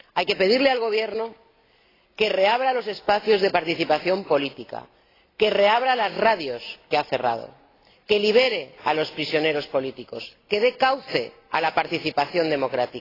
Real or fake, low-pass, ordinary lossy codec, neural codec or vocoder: real; 5.4 kHz; AAC, 24 kbps; none